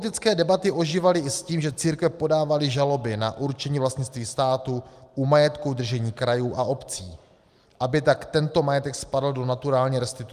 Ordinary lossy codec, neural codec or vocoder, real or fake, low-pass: Opus, 32 kbps; none; real; 14.4 kHz